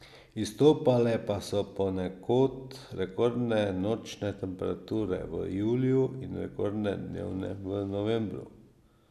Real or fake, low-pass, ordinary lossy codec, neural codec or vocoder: real; 14.4 kHz; none; none